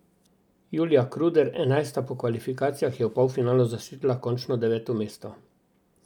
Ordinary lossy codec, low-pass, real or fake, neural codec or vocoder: none; 19.8 kHz; real; none